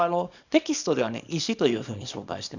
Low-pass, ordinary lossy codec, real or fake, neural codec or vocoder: 7.2 kHz; none; fake; codec, 24 kHz, 0.9 kbps, WavTokenizer, small release